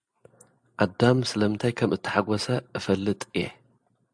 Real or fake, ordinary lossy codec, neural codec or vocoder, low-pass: real; AAC, 64 kbps; none; 9.9 kHz